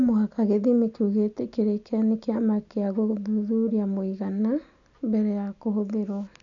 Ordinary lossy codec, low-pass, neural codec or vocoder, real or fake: none; 7.2 kHz; none; real